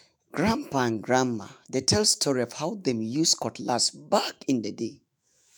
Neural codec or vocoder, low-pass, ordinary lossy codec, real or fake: autoencoder, 48 kHz, 128 numbers a frame, DAC-VAE, trained on Japanese speech; none; none; fake